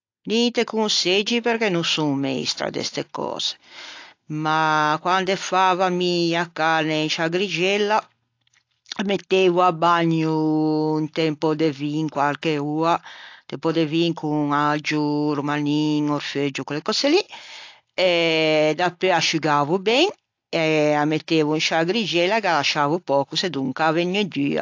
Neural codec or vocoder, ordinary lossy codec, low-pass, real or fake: none; AAC, 48 kbps; 7.2 kHz; real